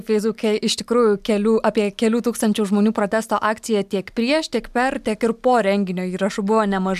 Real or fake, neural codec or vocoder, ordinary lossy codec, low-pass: real; none; MP3, 96 kbps; 14.4 kHz